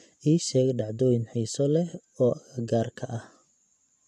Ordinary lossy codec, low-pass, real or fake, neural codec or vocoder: none; none; fake; vocoder, 24 kHz, 100 mel bands, Vocos